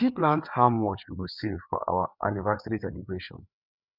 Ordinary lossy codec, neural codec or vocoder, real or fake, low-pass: none; codec, 16 kHz, 4 kbps, FreqCodec, larger model; fake; 5.4 kHz